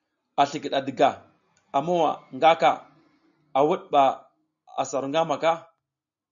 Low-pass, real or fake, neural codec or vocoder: 7.2 kHz; real; none